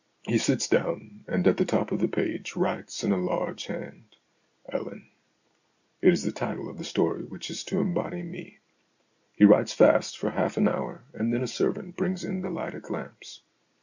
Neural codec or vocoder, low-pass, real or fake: none; 7.2 kHz; real